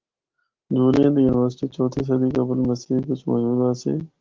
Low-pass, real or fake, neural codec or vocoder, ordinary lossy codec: 7.2 kHz; real; none; Opus, 16 kbps